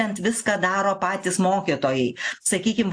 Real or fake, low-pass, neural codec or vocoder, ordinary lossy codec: real; 9.9 kHz; none; AAC, 64 kbps